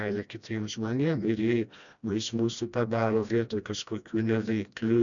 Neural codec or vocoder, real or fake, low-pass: codec, 16 kHz, 1 kbps, FreqCodec, smaller model; fake; 7.2 kHz